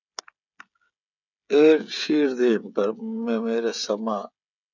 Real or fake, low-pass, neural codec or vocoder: fake; 7.2 kHz; codec, 16 kHz, 16 kbps, FreqCodec, smaller model